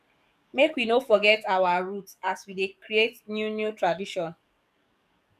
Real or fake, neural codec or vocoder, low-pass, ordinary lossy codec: fake; codec, 44.1 kHz, 7.8 kbps, DAC; 14.4 kHz; none